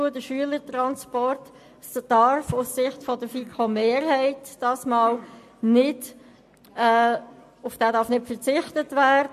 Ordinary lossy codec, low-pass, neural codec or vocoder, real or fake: MP3, 64 kbps; 14.4 kHz; none; real